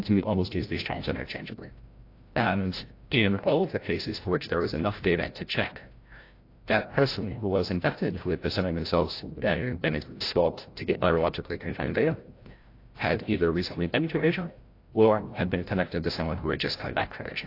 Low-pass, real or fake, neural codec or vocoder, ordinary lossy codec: 5.4 kHz; fake; codec, 16 kHz, 0.5 kbps, FreqCodec, larger model; AAC, 32 kbps